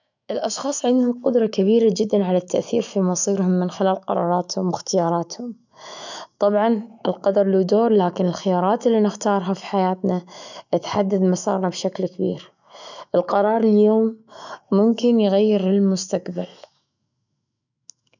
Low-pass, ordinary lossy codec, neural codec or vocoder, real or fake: 7.2 kHz; none; autoencoder, 48 kHz, 128 numbers a frame, DAC-VAE, trained on Japanese speech; fake